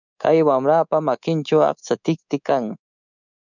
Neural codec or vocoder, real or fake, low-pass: codec, 24 kHz, 3.1 kbps, DualCodec; fake; 7.2 kHz